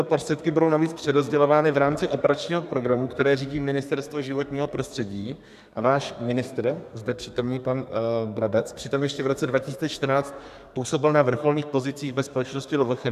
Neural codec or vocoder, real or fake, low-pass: codec, 32 kHz, 1.9 kbps, SNAC; fake; 14.4 kHz